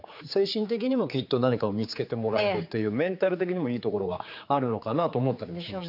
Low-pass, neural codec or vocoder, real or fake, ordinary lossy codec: 5.4 kHz; codec, 16 kHz, 4 kbps, X-Codec, HuBERT features, trained on general audio; fake; none